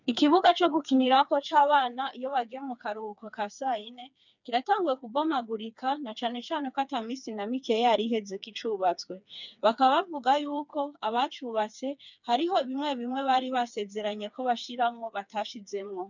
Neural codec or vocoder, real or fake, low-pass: codec, 16 kHz, 4 kbps, FreqCodec, smaller model; fake; 7.2 kHz